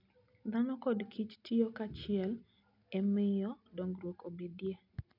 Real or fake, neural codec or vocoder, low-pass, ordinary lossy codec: real; none; 5.4 kHz; none